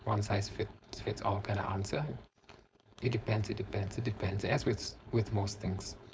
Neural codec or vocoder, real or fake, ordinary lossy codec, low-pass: codec, 16 kHz, 4.8 kbps, FACodec; fake; none; none